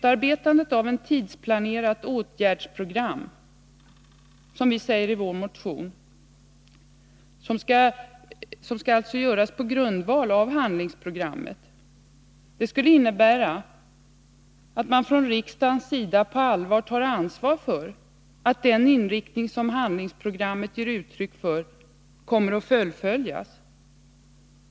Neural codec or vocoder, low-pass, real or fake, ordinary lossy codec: none; none; real; none